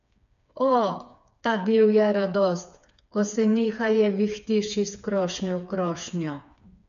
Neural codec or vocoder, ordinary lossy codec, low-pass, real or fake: codec, 16 kHz, 4 kbps, FreqCodec, smaller model; none; 7.2 kHz; fake